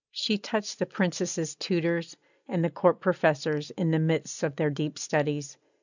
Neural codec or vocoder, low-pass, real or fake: none; 7.2 kHz; real